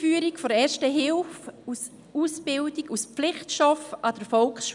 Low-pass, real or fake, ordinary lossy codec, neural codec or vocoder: 10.8 kHz; real; none; none